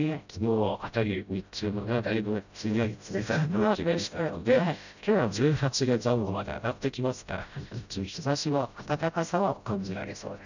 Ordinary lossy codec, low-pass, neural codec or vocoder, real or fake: none; 7.2 kHz; codec, 16 kHz, 0.5 kbps, FreqCodec, smaller model; fake